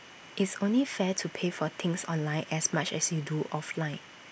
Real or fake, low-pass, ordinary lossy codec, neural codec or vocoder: real; none; none; none